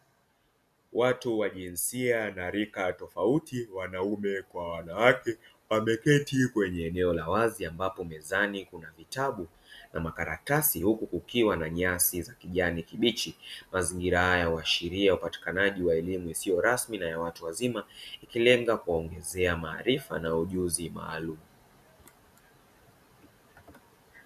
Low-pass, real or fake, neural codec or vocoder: 14.4 kHz; real; none